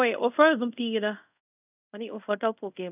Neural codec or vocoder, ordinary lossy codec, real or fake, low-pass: codec, 24 kHz, 0.5 kbps, DualCodec; none; fake; 3.6 kHz